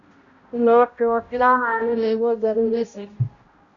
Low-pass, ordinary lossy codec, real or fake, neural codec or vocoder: 7.2 kHz; Opus, 64 kbps; fake; codec, 16 kHz, 0.5 kbps, X-Codec, HuBERT features, trained on balanced general audio